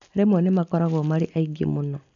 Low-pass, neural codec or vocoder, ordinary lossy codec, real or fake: 7.2 kHz; none; none; real